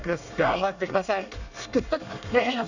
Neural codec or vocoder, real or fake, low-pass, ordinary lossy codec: codec, 24 kHz, 1 kbps, SNAC; fake; 7.2 kHz; none